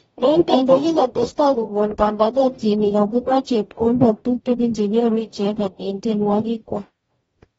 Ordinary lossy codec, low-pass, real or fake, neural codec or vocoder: AAC, 24 kbps; 19.8 kHz; fake; codec, 44.1 kHz, 0.9 kbps, DAC